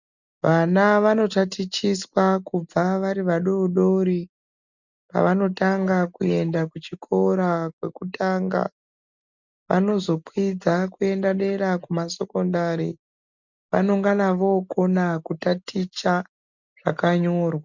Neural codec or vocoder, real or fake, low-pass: none; real; 7.2 kHz